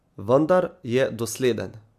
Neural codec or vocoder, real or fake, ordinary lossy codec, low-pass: none; real; AAC, 96 kbps; 14.4 kHz